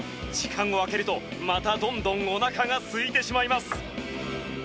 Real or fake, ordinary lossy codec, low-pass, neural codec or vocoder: real; none; none; none